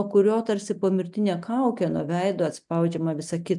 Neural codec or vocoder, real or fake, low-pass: none; real; 10.8 kHz